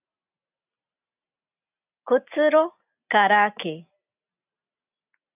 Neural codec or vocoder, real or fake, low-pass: none; real; 3.6 kHz